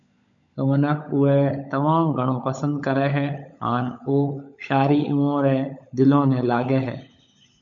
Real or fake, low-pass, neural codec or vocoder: fake; 7.2 kHz; codec, 16 kHz, 16 kbps, FunCodec, trained on LibriTTS, 50 frames a second